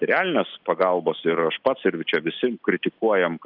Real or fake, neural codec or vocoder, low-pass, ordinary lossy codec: real; none; 5.4 kHz; Opus, 32 kbps